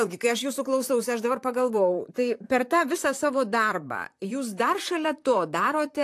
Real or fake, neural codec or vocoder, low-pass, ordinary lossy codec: fake; vocoder, 44.1 kHz, 128 mel bands, Pupu-Vocoder; 14.4 kHz; AAC, 64 kbps